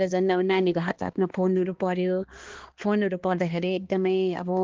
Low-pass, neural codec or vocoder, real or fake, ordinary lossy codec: 7.2 kHz; codec, 16 kHz, 2 kbps, X-Codec, HuBERT features, trained on balanced general audio; fake; Opus, 16 kbps